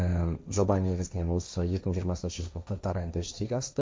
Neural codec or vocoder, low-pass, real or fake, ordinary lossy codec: codec, 16 kHz, 1.1 kbps, Voila-Tokenizer; none; fake; none